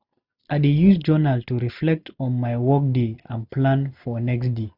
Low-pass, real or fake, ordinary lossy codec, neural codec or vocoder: 5.4 kHz; real; none; none